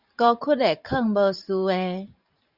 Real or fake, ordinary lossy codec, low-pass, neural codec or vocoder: fake; Opus, 64 kbps; 5.4 kHz; vocoder, 44.1 kHz, 128 mel bands every 512 samples, BigVGAN v2